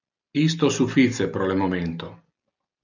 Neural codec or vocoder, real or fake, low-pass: none; real; 7.2 kHz